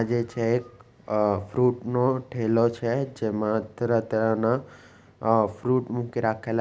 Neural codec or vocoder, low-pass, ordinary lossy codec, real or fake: none; none; none; real